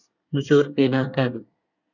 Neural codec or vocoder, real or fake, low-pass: codec, 24 kHz, 1 kbps, SNAC; fake; 7.2 kHz